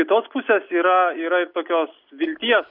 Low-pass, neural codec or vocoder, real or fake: 5.4 kHz; none; real